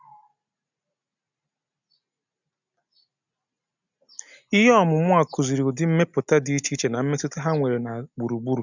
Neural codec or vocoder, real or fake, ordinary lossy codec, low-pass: none; real; none; 7.2 kHz